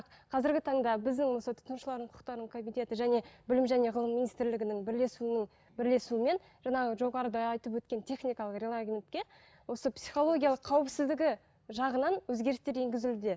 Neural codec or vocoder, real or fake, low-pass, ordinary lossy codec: none; real; none; none